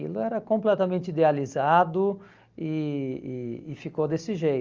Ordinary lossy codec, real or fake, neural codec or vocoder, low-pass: Opus, 32 kbps; real; none; 7.2 kHz